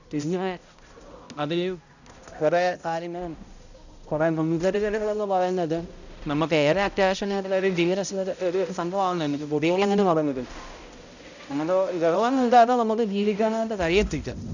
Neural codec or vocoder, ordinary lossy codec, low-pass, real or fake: codec, 16 kHz, 0.5 kbps, X-Codec, HuBERT features, trained on balanced general audio; none; 7.2 kHz; fake